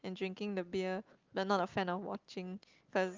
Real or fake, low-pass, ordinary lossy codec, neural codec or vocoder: real; 7.2 kHz; Opus, 24 kbps; none